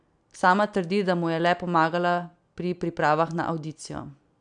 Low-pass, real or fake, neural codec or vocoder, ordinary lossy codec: 9.9 kHz; real; none; none